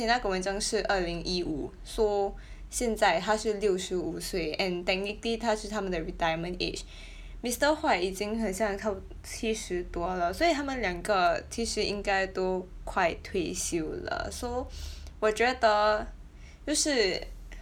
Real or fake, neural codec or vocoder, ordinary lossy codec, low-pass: real; none; none; 19.8 kHz